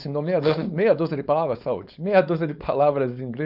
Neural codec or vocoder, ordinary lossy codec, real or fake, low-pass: codec, 16 kHz, 4.8 kbps, FACodec; none; fake; 5.4 kHz